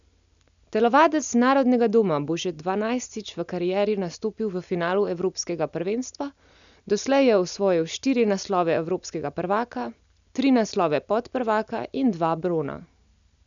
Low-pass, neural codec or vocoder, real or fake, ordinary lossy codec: 7.2 kHz; none; real; none